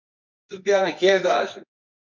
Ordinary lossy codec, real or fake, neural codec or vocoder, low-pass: MP3, 48 kbps; fake; codec, 24 kHz, 0.9 kbps, WavTokenizer, medium music audio release; 7.2 kHz